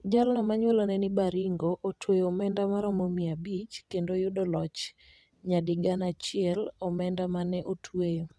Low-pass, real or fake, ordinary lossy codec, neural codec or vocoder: none; fake; none; vocoder, 22.05 kHz, 80 mel bands, WaveNeXt